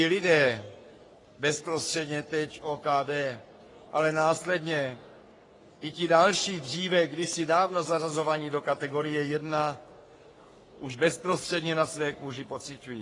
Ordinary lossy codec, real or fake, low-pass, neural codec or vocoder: AAC, 32 kbps; fake; 10.8 kHz; codec, 44.1 kHz, 3.4 kbps, Pupu-Codec